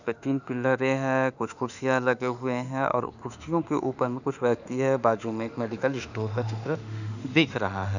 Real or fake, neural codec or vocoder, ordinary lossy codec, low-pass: fake; autoencoder, 48 kHz, 32 numbers a frame, DAC-VAE, trained on Japanese speech; none; 7.2 kHz